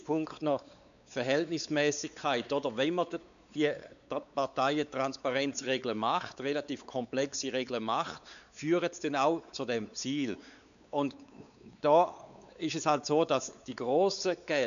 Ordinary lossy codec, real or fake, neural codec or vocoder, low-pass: none; fake; codec, 16 kHz, 4 kbps, X-Codec, WavLM features, trained on Multilingual LibriSpeech; 7.2 kHz